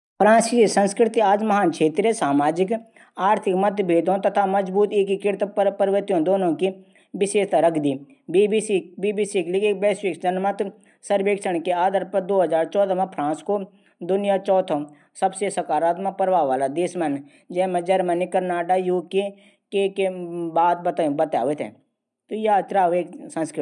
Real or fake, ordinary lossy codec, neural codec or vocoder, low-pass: real; none; none; 10.8 kHz